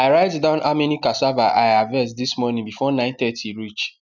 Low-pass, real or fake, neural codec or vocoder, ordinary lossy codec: 7.2 kHz; real; none; none